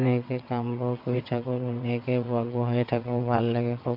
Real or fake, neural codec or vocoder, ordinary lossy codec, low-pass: fake; vocoder, 22.05 kHz, 80 mel bands, WaveNeXt; none; 5.4 kHz